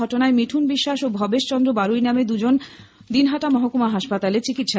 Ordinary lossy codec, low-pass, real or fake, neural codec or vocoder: none; none; real; none